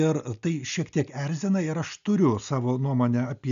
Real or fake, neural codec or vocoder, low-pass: real; none; 7.2 kHz